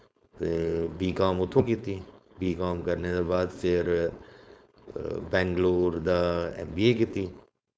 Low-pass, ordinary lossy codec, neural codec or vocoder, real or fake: none; none; codec, 16 kHz, 4.8 kbps, FACodec; fake